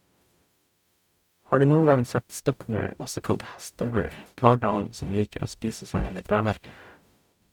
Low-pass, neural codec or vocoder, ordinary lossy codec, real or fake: 19.8 kHz; codec, 44.1 kHz, 0.9 kbps, DAC; none; fake